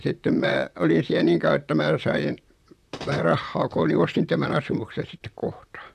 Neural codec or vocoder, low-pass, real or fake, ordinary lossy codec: vocoder, 44.1 kHz, 128 mel bands, Pupu-Vocoder; 14.4 kHz; fake; none